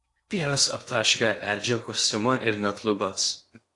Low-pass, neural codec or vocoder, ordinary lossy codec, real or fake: 10.8 kHz; codec, 16 kHz in and 24 kHz out, 0.8 kbps, FocalCodec, streaming, 65536 codes; AAC, 48 kbps; fake